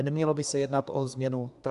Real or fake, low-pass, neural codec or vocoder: fake; 10.8 kHz; codec, 24 kHz, 1 kbps, SNAC